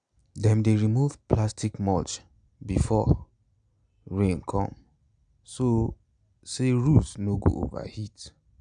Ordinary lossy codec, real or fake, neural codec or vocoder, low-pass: none; real; none; 9.9 kHz